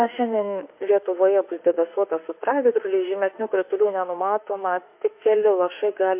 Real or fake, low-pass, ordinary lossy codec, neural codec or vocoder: fake; 3.6 kHz; MP3, 32 kbps; autoencoder, 48 kHz, 32 numbers a frame, DAC-VAE, trained on Japanese speech